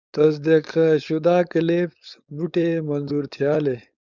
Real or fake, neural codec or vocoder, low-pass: fake; codec, 16 kHz, 4.8 kbps, FACodec; 7.2 kHz